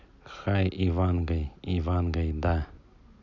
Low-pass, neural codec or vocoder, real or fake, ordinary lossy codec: 7.2 kHz; none; real; none